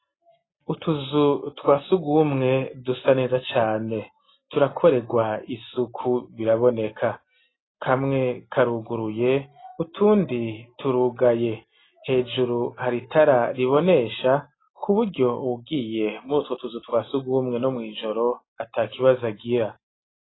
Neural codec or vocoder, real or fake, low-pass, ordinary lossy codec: none; real; 7.2 kHz; AAC, 16 kbps